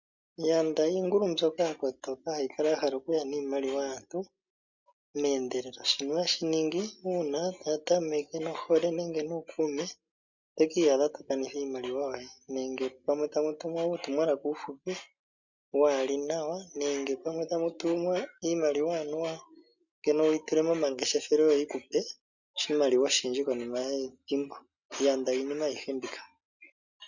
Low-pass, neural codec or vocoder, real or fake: 7.2 kHz; none; real